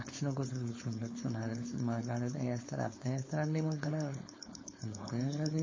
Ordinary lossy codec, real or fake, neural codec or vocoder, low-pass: MP3, 32 kbps; fake; codec, 16 kHz, 4.8 kbps, FACodec; 7.2 kHz